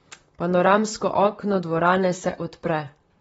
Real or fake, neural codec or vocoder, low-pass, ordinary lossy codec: fake; vocoder, 44.1 kHz, 128 mel bands, Pupu-Vocoder; 19.8 kHz; AAC, 24 kbps